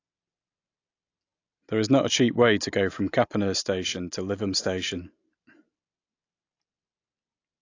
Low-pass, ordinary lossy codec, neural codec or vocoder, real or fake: 7.2 kHz; AAC, 48 kbps; none; real